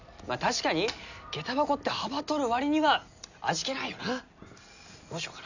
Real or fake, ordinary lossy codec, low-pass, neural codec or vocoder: real; none; 7.2 kHz; none